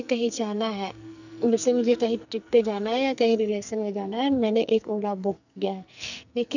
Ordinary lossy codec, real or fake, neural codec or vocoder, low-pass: none; fake; codec, 44.1 kHz, 2.6 kbps, SNAC; 7.2 kHz